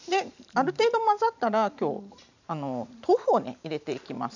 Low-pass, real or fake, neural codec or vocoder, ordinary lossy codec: 7.2 kHz; fake; vocoder, 44.1 kHz, 128 mel bands every 512 samples, BigVGAN v2; none